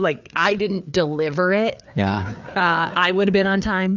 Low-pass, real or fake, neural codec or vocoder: 7.2 kHz; fake; codec, 16 kHz, 4 kbps, FreqCodec, larger model